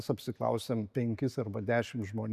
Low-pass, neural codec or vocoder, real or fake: 14.4 kHz; none; real